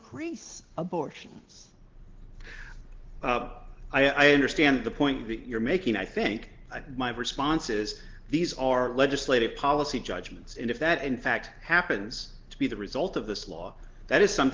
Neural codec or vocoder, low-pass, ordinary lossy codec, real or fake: none; 7.2 kHz; Opus, 16 kbps; real